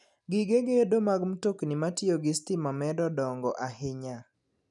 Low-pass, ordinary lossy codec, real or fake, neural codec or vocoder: 10.8 kHz; none; real; none